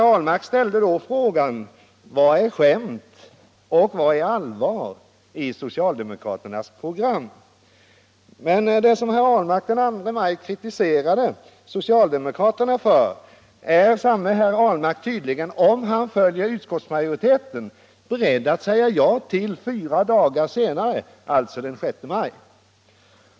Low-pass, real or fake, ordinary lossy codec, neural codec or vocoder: none; real; none; none